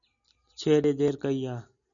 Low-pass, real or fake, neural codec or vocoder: 7.2 kHz; real; none